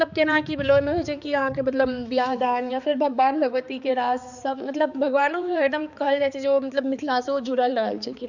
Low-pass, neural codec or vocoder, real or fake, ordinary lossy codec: 7.2 kHz; codec, 16 kHz, 4 kbps, X-Codec, HuBERT features, trained on balanced general audio; fake; none